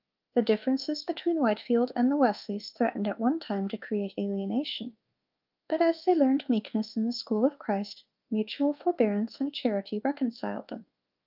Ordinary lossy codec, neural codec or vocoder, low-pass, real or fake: Opus, 24 kbps; codec, 24 kHz, 1.2 kbps, DualCodec; 5.4 kHz; fake